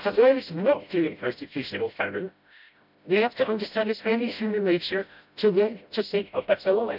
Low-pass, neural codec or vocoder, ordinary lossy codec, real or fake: 5.4 kHz; codec, 16 kHz, 0.5 kbps, FreqCodec, smaller model; none; fake